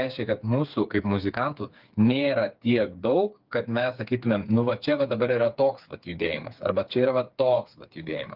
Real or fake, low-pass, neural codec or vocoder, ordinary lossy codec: fake; 5.4 kHz; codec, 16 kHz, 4 kbps, FreqCodec, smaller model; Opus, 24 kbps